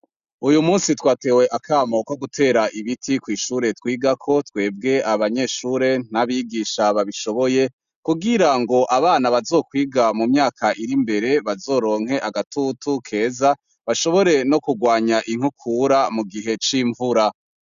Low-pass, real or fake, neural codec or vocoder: 7.2 kHz; real; none